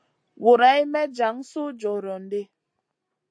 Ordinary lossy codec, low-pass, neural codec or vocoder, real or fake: AAC, 64 kbps; 9.9 kHz; none; real